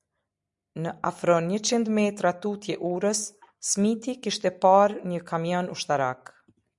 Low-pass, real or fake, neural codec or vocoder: 10.8 kHz; real; none